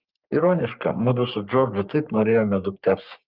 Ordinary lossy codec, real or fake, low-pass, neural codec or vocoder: Opus, 32 kbps; fake; 5.4 kHz; codec, 44.1 kHz, 3.4 kbps, Pupu-Codec